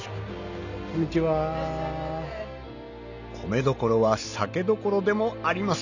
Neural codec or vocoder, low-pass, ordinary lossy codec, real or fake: none; 7.2 kHz; none; real